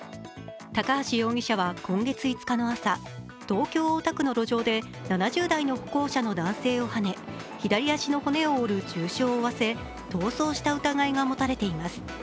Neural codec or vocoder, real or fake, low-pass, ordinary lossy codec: none; real; none; none